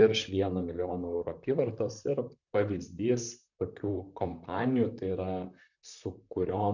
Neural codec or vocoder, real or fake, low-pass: vocoder, 44.1 kHz, 128 mel bands, Pupu-Vocoder; fake; 7.2 kHz